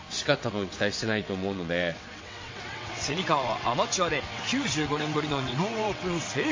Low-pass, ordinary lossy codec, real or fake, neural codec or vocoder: 7.2 kHz; MP3, 32 kbps; fake; vocoder, 22.05 kHz, 80 mel bands, WaveNeXt